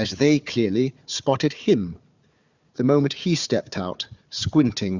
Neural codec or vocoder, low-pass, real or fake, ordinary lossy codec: codec, 16 kHz, 4 kbps, FunCodec, trained on Chinese and English, 50 frames a second; 7.2 kHz; fake; Opus, 64 kbps